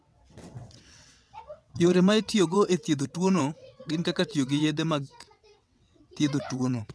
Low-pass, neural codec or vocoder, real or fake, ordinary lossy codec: none; vocoder, 22.05 kHz, 80 mel bands, WaveNeXt; fake; none